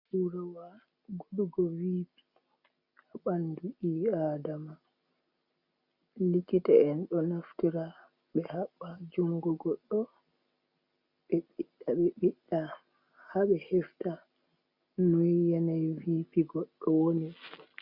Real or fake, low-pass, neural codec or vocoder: real; 5.4 kHz; none